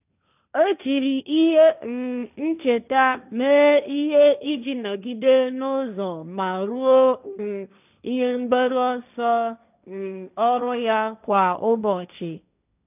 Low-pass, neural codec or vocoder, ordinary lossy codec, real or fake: 3.6 kHz; codec, 16 kHz, 1.1 kbps, Voila-Tokenizer; none; fake